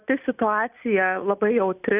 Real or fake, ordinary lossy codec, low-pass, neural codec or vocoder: real; Opus, 64 kbps; 3.6 kHz; none